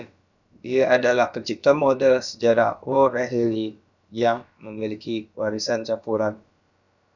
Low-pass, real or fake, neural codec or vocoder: 7.2 kHz; fake; codec, 16 kHz, about 1 kbps, DyCAST, with the encoder's durations